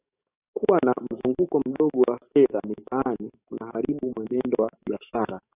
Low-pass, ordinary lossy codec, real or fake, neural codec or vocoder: 3.6 kHz; AAC, 24 kbps; fake; codec, 44.1 kHz, 7.8 kbps, DAC